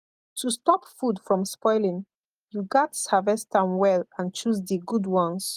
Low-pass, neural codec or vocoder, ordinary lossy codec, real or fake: 14.4 kHz; none; Opus, 24 kbps; real